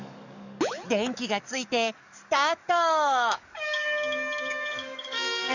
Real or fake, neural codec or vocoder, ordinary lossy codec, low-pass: fake; codec, 44.1 kHz, 7.8 kbps, DAC; none; 7.2 kHz